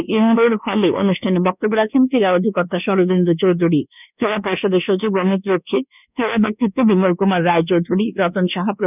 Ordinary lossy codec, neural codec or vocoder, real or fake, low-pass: none; codec, 24 kHz, 1.2 kbps, DualCodec; fake; 3.6 kHz